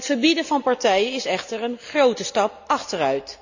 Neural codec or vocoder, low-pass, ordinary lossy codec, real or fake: none; 7.2 kHz; none; real